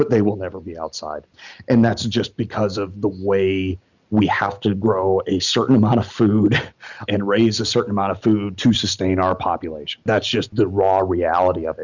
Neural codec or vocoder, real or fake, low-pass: none; real; 7.2 kHz